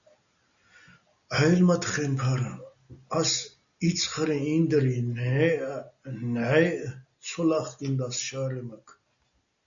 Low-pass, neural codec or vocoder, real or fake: 7.2 kHz; none; real